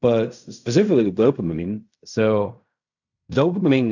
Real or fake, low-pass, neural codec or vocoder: fake; 7.2 kHz; codec, 16 kHz in and 24 kHz out, 0.4 kbps, LongCat-Audio-Codec, fine tuned four codebook decoder